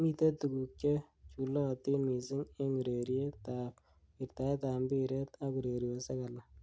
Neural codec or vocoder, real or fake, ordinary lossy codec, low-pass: none; real; none; none